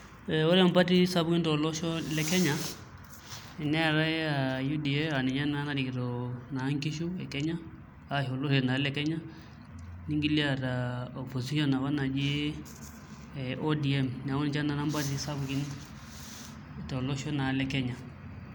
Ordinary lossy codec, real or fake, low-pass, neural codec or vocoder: none; real; none; none